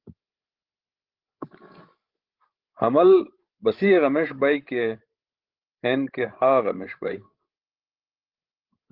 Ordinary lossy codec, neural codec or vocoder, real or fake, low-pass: Opus, 16 kbps; codec, 16 kHz, 16 kbps, FreqCodec, larger model; fake; 5.4 kHz